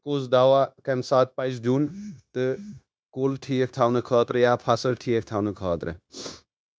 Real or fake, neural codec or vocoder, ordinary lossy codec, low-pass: fake; codec, 16 kHz, 0.9 kbps, LongCat-Audio-Codec; none; none